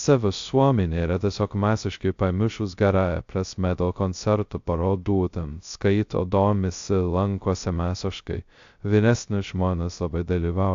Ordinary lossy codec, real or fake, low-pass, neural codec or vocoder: AAC, 64 kbps; fake; 7.2 kHz; codec, 16 kHz, 0.2 kbps, FocalCodec